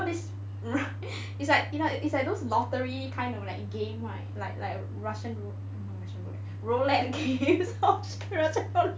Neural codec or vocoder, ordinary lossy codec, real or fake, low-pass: none; none; real; none